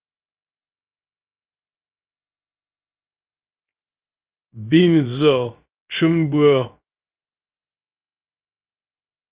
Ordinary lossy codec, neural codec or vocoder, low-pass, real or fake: Opus, 32 kbps; codec, 16 kHz, 0.3 kbps, FocalCodec; 3.6 kHz; fake